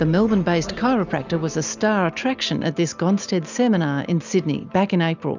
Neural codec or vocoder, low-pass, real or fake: none; 7.2 kHz; real